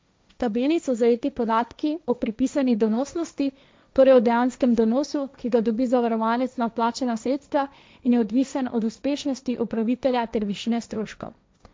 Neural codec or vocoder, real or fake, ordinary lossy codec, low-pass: codec, 16 kHz, 1.1 kbps, Voila-Tokenizer; fake; none; none